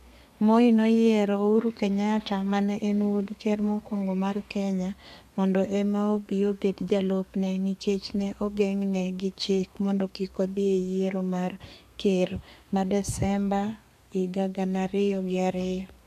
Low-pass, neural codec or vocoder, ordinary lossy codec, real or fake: 14.4 kHz; codec, 32 kHz, 1.9 kbps, SNAC; MP3, 96 kbps; fake